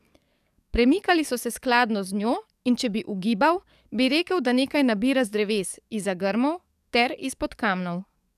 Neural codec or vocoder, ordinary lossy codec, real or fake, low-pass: codec, 44.1 kHz, 7.8 kbps, DAC; none; fake; 14.4 kHz